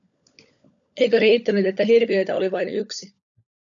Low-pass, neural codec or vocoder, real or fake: 7.2 kHz; codec, 16 kHz, 16 kbps, FunCodec, trained on LibriTTS, 50 frames a second; fake